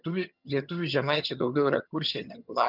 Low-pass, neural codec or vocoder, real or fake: 5.4 kHz; vocoder, 22.05 kHz, 80 mel bands, HiFi-GAN; fake